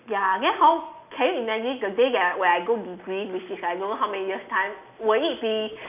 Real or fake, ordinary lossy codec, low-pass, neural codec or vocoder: real; none; 3.6 kHz; none